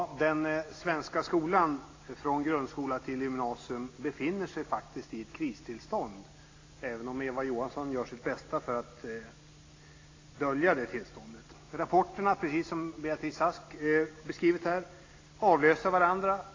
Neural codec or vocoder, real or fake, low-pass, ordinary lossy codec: none; real; 7.2 kHz; AAC, 32 kbps